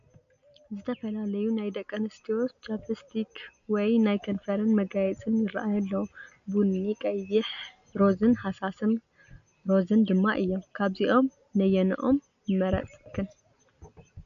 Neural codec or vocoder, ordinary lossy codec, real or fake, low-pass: none; AAC, 96 kbps; real; 7.2 kHz